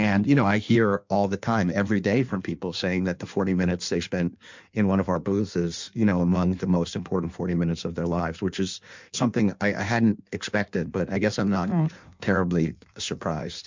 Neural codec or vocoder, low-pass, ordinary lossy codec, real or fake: codec, 16 kHz in and 24 kHz out, 1.1 kbps, FireRedTTS-2 codec; 7.2 kHz; MP3, 64 kbps; fake